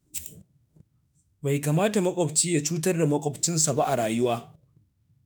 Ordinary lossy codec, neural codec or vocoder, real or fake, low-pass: none; autoencoder, 48 kHz, 32 numbers a frame, DAC-VAE, trained on Japanese speech; fake; none